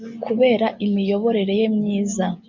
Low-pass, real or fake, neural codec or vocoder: 7.2 kHz; real; none